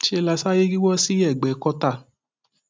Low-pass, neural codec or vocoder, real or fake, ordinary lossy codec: none; none; real; none